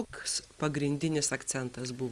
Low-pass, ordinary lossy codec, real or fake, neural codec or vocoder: 10.8 kHz; Opus, 32 kbps; real; none